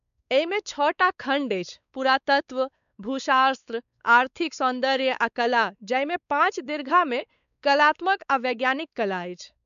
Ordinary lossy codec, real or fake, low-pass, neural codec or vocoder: none; fake; 7.2 kHz; codec, 16 kHz, 4 kbps, X-Codec, WavLM features, trained on Multilingual LibriSpeech